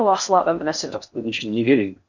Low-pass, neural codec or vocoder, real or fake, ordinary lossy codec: 7.2 kHz; codec, 16 kHz in and 24 kHz out, 0.6 kbps, FocalCodec, streaming, 4096 codes; fake; none